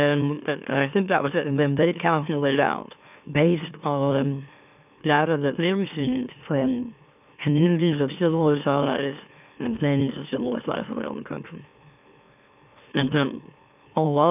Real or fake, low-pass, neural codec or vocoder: fake; 3.6 kHz; autoencoder, 44.1 kHz, a latent of 192 numbers a frame, MeloTTS